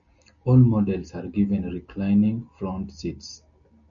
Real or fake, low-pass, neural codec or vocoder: real; 7.2 kHz; none